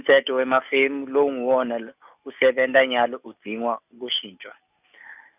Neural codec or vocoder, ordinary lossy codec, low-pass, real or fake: none; none; 3.6 kHz; real